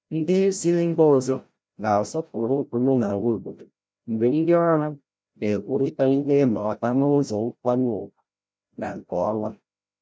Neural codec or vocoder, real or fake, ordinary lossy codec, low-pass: codec, 16 kHz, 0.5 kbps, FreqCodec, larger model; fake; none; none